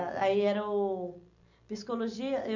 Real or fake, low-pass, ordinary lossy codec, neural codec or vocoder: real; 7.2 kHz; none; none